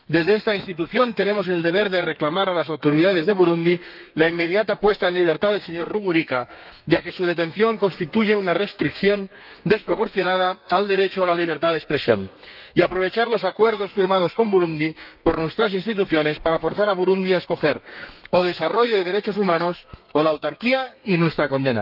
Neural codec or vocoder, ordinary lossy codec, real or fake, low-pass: codec, 32 kHz, 1.9 kbps, SNAC; none; fake; 5.4 kHz